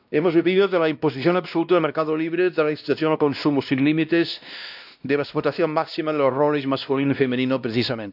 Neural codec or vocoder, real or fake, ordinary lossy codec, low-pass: codec, 16 kHz, 1 kbps, X-Codec, WavLM features, trained on Multilingual LibriSpeech; fake; none; 5.4 kHz